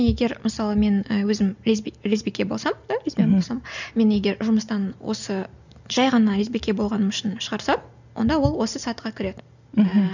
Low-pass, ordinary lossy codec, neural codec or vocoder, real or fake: 7.2 kHz; none; none; real